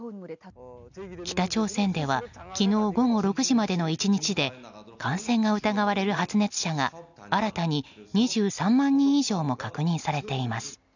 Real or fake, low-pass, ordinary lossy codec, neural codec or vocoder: real; 7.2 kHz; none; none